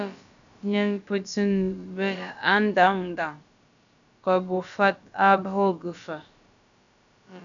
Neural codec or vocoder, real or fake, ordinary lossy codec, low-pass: codec, 16 kHz, about 1 kbps, DyCAST, with the encoder's durations; fake; MP3, 96 kbps; 7.2 kHz